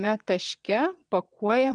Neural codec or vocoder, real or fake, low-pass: vocoder, 22.05 kHz, 80 mel bands, WaveNeXt; fake; 9.9 kHz